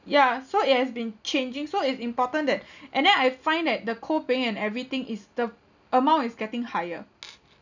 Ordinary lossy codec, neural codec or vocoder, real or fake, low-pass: none; none; real; 7.2 kHz